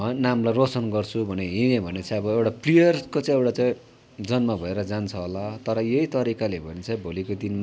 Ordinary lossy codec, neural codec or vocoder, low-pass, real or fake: none; none; none; real